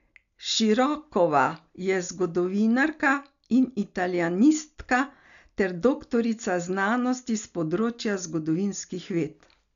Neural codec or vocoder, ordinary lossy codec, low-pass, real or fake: none; none; 7.2 kHz; real